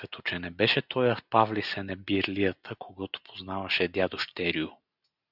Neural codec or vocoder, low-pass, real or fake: none; 5.4 kHz; real